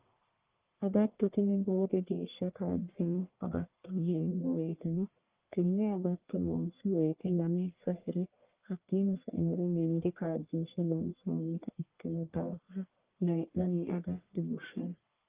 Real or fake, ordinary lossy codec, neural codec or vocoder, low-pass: fake; Opus, 32 kbps; codec, 44.1 kHz, 1.7 kbps, Pupu-Codec; 3.6 kHz